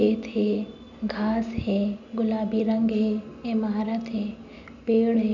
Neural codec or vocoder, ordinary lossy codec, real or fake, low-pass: none; none; real; 7.2 kHz